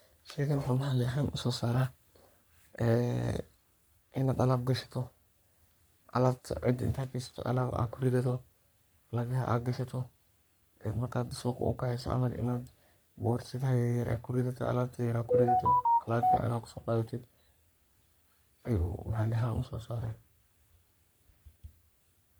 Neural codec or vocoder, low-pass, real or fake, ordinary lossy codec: codec, 44.1 kHz, 3.4 kbps, Pupu-Codec; none; fake; none